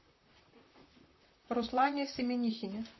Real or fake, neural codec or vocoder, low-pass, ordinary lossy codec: fake; vocoder, 44.1 kHz, 128 mel bands, Pupu-Vocoder; 7.2 kHz; MP3, 24 kbps